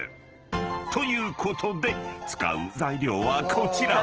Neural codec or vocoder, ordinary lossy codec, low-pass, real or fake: none; Opus, 16 kbps; 7.2 kHz; real